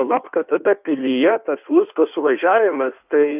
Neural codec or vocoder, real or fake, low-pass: codec, 16 kHz in and 24 kHz out, 1.1 kbps, FireRedTTS-2 codec; fake; 3.6 kHz